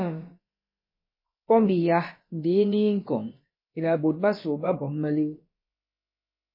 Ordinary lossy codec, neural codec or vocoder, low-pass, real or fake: MP3, 24 kbps; codec, 16 kHz, about 1 kbps, DyCAST, with the encoder's durations; 5.4 kHz; fake